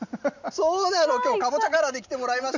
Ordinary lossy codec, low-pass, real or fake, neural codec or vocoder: none; 7.2 kHz; real; none